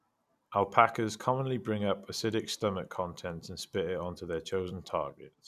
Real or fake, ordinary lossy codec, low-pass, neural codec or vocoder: real; none; 14.4 kHz; none